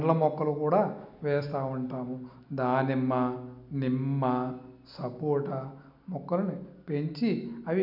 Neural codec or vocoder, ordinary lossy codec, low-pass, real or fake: none; none; 5.4 kHz; real